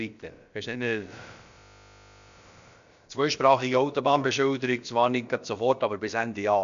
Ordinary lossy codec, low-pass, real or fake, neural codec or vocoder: MP3, 64 kbps; 7.2 kHz; fake; codec, 16 kHz, about 1 kbps, DyCAST, with the encoder's durations